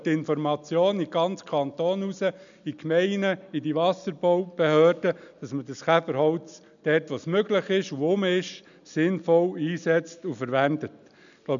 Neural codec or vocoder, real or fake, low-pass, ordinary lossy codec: none; real; 7.2 kHz; none